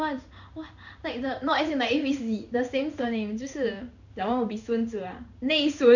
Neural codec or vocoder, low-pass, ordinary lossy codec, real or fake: codec, 16 kHz in and 24 kHz out, 1 kbps, XY-Tokenizer; 7.2 kHz; none; fake